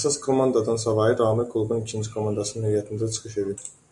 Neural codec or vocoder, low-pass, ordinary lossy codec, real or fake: none; 10.8 kHz; MP3, 96 kbps; real